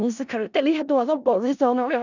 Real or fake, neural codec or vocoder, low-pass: fake; codec, 16 kHz in and 24 kHz out, 0.4 kbps, LongCat-Audio-Codec, four codebook decoder; 7.2 kHz